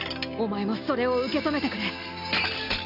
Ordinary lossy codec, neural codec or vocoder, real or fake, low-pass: AAC, 48 kbps; none; real; 5.4 kHz